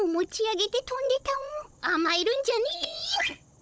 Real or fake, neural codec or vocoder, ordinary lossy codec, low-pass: fake; codec, 16 kHz, 16 kbps, FunCodec, trained on Chinese and English, 50 frames a second; none; none